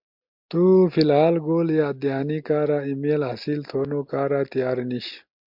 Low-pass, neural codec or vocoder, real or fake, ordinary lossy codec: 5.4 kHz; none; real; MP3, 48 kbps